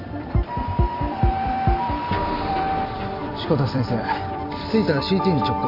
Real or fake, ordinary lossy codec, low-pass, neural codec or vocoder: fake; none; 5.4 kHz; vocoder, 44.1 kHz, 128 mel bands every 256 samples, BigVGAN v2